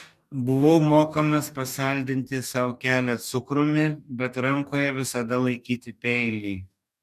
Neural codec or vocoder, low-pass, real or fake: codec, 44.1 kHz, 2.6 kbps, DAC; 14.4 kHz; fake